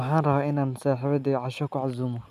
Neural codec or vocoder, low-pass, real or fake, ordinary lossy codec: none; 14.4 kHz; real; none